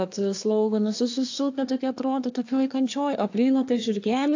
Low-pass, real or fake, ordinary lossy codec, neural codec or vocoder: 7.2 kHz; fake; AAC, 48 kbps; codec, 24 kHz, 1 kbps, SNAC